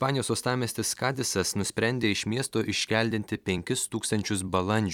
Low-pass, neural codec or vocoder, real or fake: 19.8 kHz; vocoder, 44.1 kHz, 128 mel bands every 256 samples, BigVGAN v2; fake